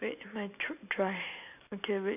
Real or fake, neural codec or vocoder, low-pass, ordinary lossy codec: real; none; 3.6 kHz; none